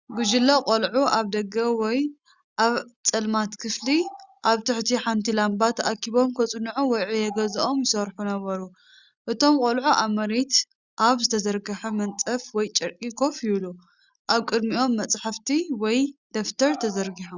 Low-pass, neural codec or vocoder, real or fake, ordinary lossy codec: 7.2 kHz; none; real; Opus, 64 kbps